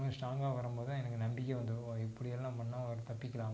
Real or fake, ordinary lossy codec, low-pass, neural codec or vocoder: real; none; none; none